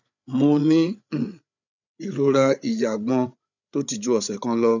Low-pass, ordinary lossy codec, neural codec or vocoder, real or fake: 7.2 kHz; none; codec, 16 kHz, 8 kbps, FreqCodec, larger model; fake